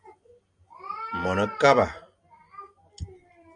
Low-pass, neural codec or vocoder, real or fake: 9.9 kHz; none; real